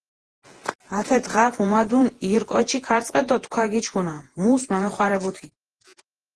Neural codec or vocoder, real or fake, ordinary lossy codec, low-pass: vocoder, 48 kHz, 128 mel bands, Vocos; fake; Opus, 16 kbps; 10.8 kHz